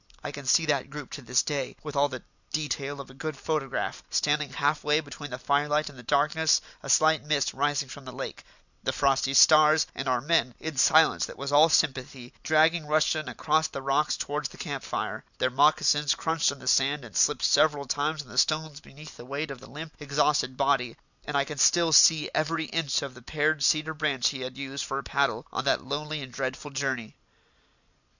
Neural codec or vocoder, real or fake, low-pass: none; real; 7.2 kHz